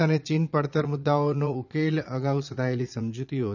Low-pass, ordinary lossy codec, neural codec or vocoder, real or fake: 7.2 kHz; none; vocoder, 22.05 kHz, 80 mel bands, Vocos; fake